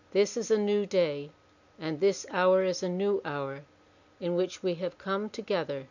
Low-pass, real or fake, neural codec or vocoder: 7.2 kHz; real; none